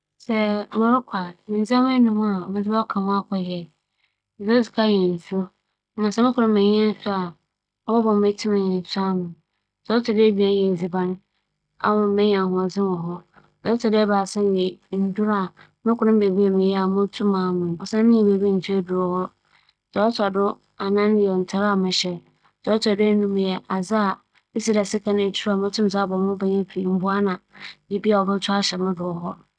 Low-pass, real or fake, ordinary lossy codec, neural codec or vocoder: 9.9 kHz; real; none; none